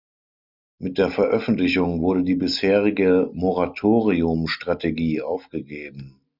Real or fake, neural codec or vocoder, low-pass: real; none; 5.4 kHz